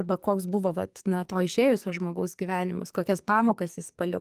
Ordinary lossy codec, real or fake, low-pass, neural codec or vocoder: Opus, 32 kbps; fake; 14.4 kHz; codec, 44.1 kHz, 2.6 kbps, SNAC